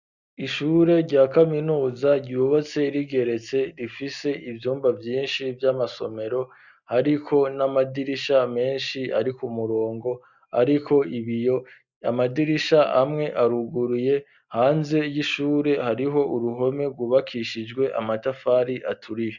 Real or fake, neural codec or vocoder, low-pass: real; none; 7.2 kHz